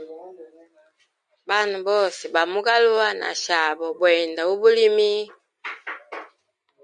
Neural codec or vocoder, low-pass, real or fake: none; 9.9 kHz; real